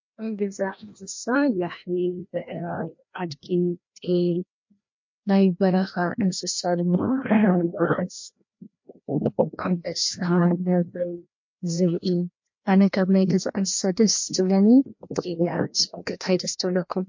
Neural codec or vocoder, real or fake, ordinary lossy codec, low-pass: codec, 16 kHz, 1 kbps, FreqCodec, larger model; fake; MP3, 48 kbps; 7.2 kHz